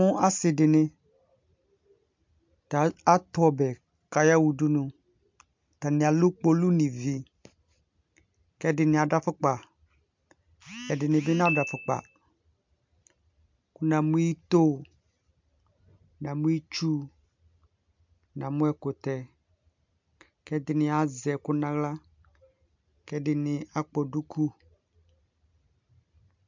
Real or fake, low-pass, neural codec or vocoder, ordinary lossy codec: real; 7.2 kHz; none; MP3, 64 kbps